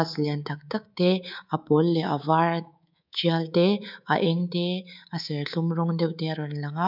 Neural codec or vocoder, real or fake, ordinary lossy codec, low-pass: codec, 24 kHz, 3.1 kbps, DualCodec; fake; none; 5.4 kHz